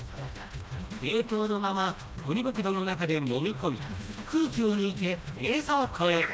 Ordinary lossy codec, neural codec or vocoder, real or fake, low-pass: none; codec, 16 kHz, 1 kbps, FreqCodec, smaller model; fake; none